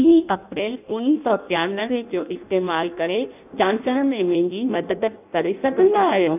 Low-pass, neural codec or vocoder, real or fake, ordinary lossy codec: 3.6 kHz; codec, 16 kHz in and 24 kHz out, 0.6 kbps, FireRedTTS-2 codec; fake; none